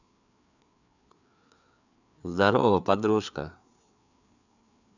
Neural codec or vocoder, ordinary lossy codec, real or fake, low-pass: codec, 16 kHz, 4 kbps, FreqCodec, larger model; none; fake; 7.2 kHz